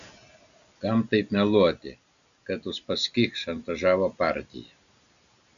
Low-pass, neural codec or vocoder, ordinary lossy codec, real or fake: 7.2 kHz; none; MP3, 96 kbps; real